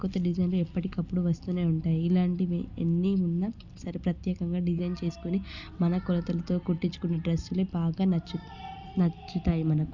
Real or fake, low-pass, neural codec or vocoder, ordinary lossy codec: real; 7.2 kHz; none; Opus, 64 kbps